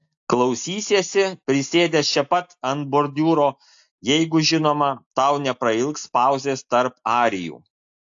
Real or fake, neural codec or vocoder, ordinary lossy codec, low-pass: real; none; AAC, 64 kbps; 7.2 kHz